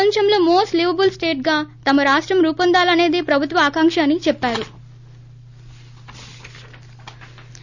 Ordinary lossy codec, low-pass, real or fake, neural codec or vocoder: none; 7.2 kHz; real; none